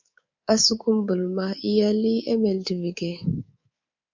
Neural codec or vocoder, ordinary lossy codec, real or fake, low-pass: codec, 24 kHz, 3.1 kbps, DualCodec; MP3, 64 kbps; fake; 7.2 kHz